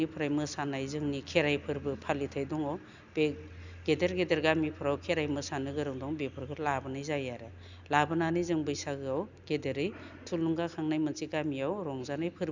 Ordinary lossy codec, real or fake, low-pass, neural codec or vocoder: none; real; 7.2 kHz; none